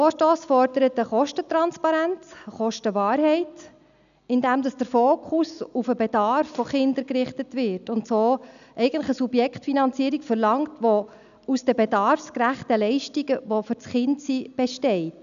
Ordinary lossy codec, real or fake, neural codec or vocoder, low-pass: none; real; none; 7.2 kHz